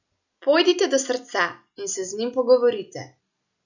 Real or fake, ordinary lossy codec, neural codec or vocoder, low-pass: real; none; none; 7.2 kHz